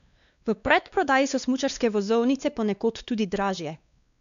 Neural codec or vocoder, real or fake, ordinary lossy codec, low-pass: codec, 16 kHz, 2 kbps, X-Codec, WavLM features, trained on Multilingual LibriSpeech; fake; none; 7.2 kHz